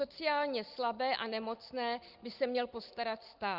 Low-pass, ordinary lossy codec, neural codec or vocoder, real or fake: 5.4 kHz; Opus, 32 kbps; none; real